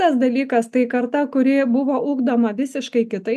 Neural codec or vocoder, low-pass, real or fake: none; 14.4 kHz; real